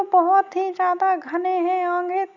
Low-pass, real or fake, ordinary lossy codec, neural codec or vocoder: 7.2 kHz; real; none; none